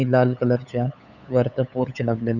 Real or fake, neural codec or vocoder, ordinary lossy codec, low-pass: fake; codec, 16 kHz, 8 kbps, FunCodec, trained on LibriTTS, 25 frames a second; none; 7.2 kHz